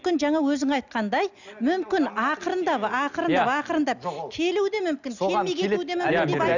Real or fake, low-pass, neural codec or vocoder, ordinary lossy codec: real; 7.2 kHz; none; none